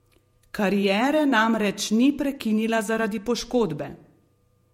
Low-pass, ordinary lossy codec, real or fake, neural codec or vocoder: 19.8 kHz; MP3, 64 kbps; fake; vocoder, 48 kHz, 128 mel bands, Vocos